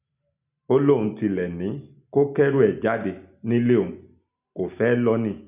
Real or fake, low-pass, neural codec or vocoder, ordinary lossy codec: real; 3.6 kHz; none; none